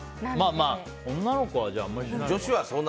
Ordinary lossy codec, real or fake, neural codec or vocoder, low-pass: none; real; none; none